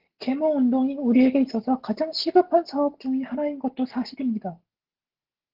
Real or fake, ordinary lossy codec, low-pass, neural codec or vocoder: real; Opus, 16 kbps; 5.4 kHz; none